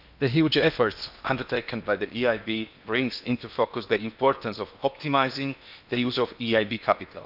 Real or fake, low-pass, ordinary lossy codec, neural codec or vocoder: fake; 5.4 kHz; none; codec, 16 kHz in and 24 kHz out, 0.8 kbps, FocalCodec, streaming, 65536 codes